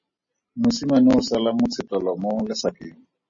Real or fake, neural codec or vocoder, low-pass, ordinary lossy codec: real; none; 7.2 kHz; MP3, 32 kbps